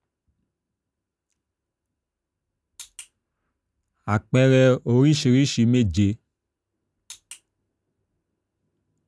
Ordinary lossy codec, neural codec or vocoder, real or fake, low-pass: none; none; real; none